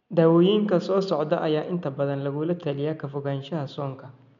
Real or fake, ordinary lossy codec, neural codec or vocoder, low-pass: real; MP3, 64 kbps; none; 7.2 kHz